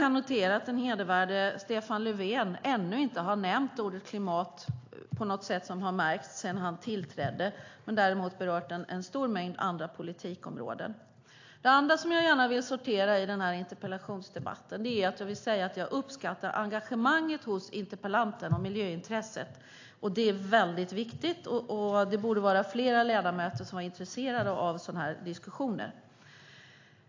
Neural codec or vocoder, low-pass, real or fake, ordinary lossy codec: none; 7.2 kHz; real; AAC, 48 kbps